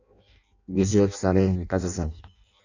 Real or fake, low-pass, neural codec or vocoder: fake; 7.2 kHz; codec, 16 kHz in and 24 kHz out, 0.6 kbps, FireRedTTS-2 codec